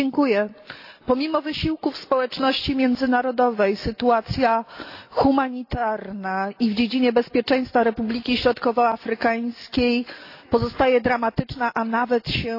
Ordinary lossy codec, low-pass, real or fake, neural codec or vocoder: AAC, 32 kbps; 5.4 kHz; real; none